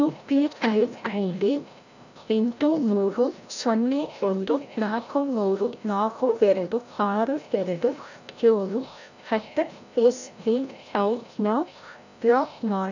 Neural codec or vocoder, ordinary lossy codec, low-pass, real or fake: codec, 16 kHz, 0.5 kbps, FreqCodec, larger model; none; 7.2 kHz; fake